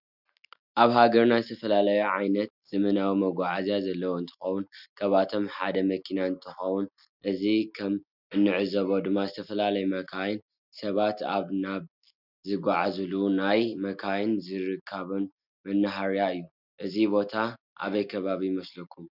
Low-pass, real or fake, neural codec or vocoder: 5.4 kHz; real; none